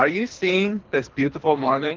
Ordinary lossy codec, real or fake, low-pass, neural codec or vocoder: Opus, 32 kbps; fake; 7.2 kHz; codec, 24 kHz, 3 kbps, HILCodec